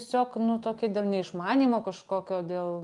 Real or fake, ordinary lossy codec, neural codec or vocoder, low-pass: real; Opus, 32 kbps; none; 10.8 kHz